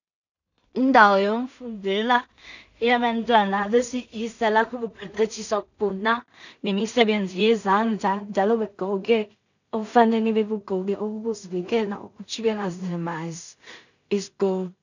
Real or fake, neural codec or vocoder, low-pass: fake; codec, 16 kHz in and 24 kHz out, 0.4 kbps, LongCat-Audio-Codec, two codebook decoder; 7.2 kHz